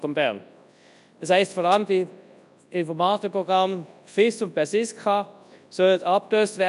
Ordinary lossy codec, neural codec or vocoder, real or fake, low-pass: none; codec, 24 kHz, 0.9 kbps, WavTokenizer, large speech release; fake; 10.8 kHz